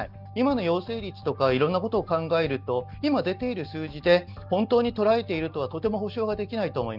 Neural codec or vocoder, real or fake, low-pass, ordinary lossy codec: none; real; 5.4 kHz; none